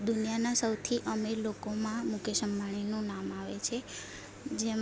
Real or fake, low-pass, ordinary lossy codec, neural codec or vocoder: real; none; none; none